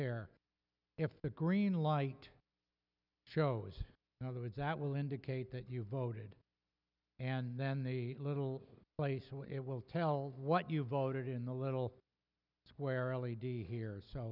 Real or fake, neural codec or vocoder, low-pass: real; none; 5.4 kHz